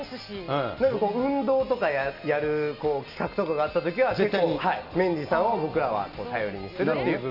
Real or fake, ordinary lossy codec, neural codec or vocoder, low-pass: real; none; none; 5.4 kHz